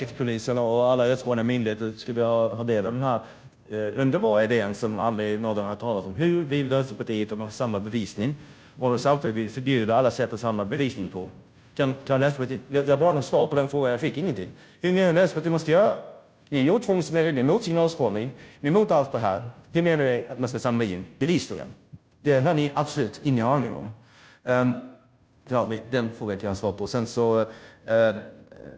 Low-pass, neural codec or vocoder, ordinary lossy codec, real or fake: none; codec, 16 kHz, 0.5 kbps, FunCodec, trained on Chinese and English, 25 frames a second; none; fake